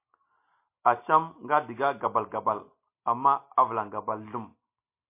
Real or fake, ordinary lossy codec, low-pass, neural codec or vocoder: real; MP3, 24 kbps; 3.6 kHz; none